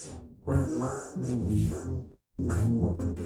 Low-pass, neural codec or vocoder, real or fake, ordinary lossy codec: none; codec, 44.1 kHz, 0.9 kbps, DAC; fake; none